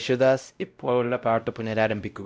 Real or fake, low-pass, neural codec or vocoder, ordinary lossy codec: fake; none; codec, 16 kHz, 0.5 kbps, X-Codec, WavLM features, trained on Multilingual LibriSpeech; none